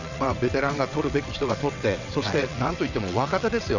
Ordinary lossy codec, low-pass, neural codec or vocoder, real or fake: none; 7.2 kHz; vocoder, 22.05 kHz, 80 mel bands, WaveNeXt; fake